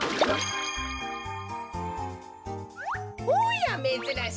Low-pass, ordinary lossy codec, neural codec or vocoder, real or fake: none; none; none; real